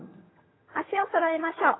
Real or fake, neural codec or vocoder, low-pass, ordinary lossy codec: fake; vocoder, 22.05 kHz, 80 mel bands, HiFi-GAN; 7.2 kHz; AAC, 16 kbps